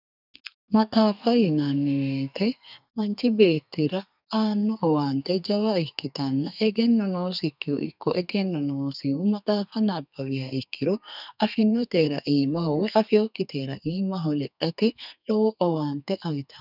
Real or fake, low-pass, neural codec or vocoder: fake; 5.4 kHz; codec, 32 kHz, 1.9 kbps, SNAC